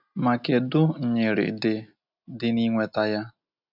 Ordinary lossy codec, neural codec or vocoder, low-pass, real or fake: none; none; 5.4 kHz; real